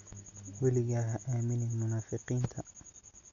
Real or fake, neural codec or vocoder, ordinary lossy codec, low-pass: real; none; none; 7.2 kHz